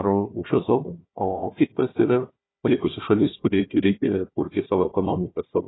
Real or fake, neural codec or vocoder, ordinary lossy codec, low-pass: fake; codec, 16 kHz, 1 kbps, FunCodec, trained on Chinese and English, 50 frames a second; AAC, 16 kbps; 7.2 kHz